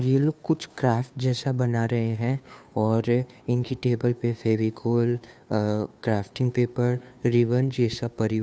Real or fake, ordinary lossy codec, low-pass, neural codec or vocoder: fake; none; none; codec, 16 kHz, 2 kbps, FunCodec, trained on Chinese and English, 25 frames a second